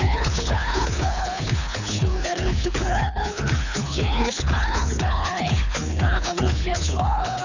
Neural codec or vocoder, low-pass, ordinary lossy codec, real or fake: codec, 24 kHz, 3 kbps, HILCodec; 7.2 kHz; none; fake